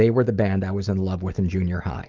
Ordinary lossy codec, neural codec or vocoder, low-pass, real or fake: Opus, 24 kbps; none; 7.2 kHz; real